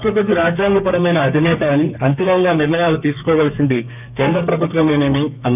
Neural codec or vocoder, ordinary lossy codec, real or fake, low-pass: codec, 32 kHz, 1.9 kbps, SNAC; none; fake; 3.6 kHz